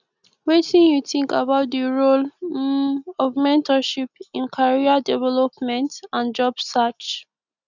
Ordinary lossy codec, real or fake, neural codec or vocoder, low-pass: none; real; none; 7.2 kHz